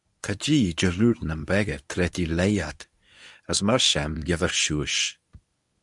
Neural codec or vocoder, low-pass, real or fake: codec, 24 kHz, 0.9 kbps, WavTokenizer, medium speech release version 1; 10.8 kHz; fake